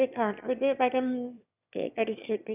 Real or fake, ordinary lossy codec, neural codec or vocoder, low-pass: fake; none; autoencoder, 22.05 kHz, a latent of 192 numbers a frame, VITS, trained on one speaker; 3.6 kHz